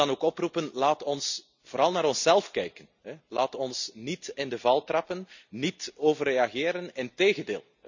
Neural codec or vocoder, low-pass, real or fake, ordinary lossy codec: none; 7.2 kHz; real; none